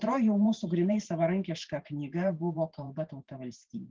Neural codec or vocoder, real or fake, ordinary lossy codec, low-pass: vocoder, 44.1 kHz, 128 mel bands every 512 samples, BigVGAN v2; fake; Opus, 16 kbps; 7.2 kHz